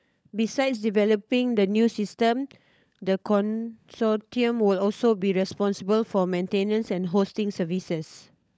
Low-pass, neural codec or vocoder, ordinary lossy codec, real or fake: none; codec, 16 kHz, 16 kbps, FunCodec, trained on LibriTTS, 50 frames a second; none; fake